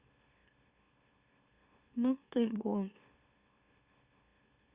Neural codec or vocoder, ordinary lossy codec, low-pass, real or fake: autoencoder, 44.1 kHz, a latent of 192 numbers a frame, MeloTTS; Opus, 64 kbps; 3.6 kHz; fake